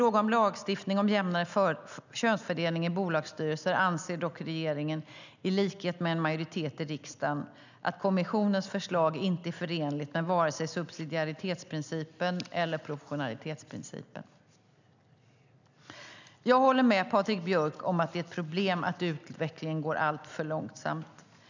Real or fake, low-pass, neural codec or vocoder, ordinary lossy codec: real; 7.2 kHz; none; none